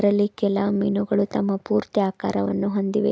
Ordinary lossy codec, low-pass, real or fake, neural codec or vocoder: none; none; real; none